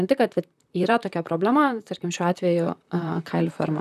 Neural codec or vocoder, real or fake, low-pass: vocoder, 44.1 kHz, 128 mel bands, Pupu-Vocoder; fake; 14.4 kHz